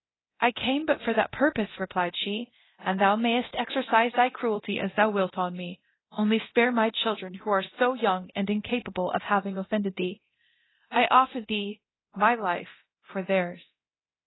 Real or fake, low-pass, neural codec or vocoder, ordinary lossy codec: fake; 7.2 kHz; codec, 24 kHz, 0.9 kbps, DualCodec; AAC, 16 kbps